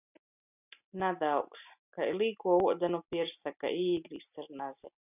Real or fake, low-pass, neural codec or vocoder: real; 3.6 kHz; none